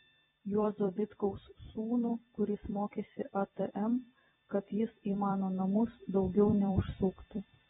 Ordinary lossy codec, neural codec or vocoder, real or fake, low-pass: AAC, 16 kbps; vocoder, 44.1 kHz, 128 mel bands every 256 samples, BigVGAN v2; fake; 19.8 kHz